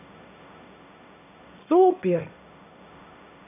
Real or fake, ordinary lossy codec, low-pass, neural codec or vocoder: fake; none; 3.6 kHz; codec, 16 kHz, 1.1 kbps, Voila-Tokenizer